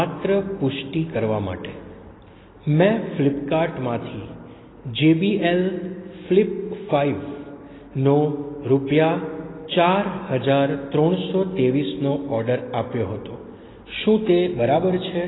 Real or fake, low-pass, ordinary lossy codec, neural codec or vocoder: real; 7.2 kHz; AAC, 16 kbps; none